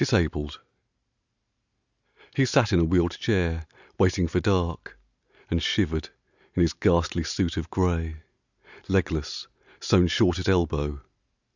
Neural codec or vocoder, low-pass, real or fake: none; 7.2 kHz; real